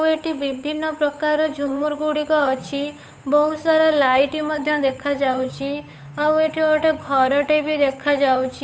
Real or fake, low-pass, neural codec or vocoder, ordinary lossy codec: fake; none; codec, 16 kHz, 8 kbps, FunCodec, trained on Chinese and English, 25 frames a second; none